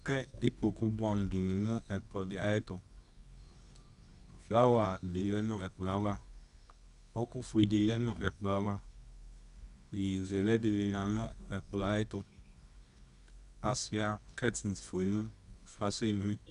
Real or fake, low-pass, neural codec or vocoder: fake; 10.8 kHz; codec, 24 kHz, 0.9 kbps, WavTokenizer, medium music audio release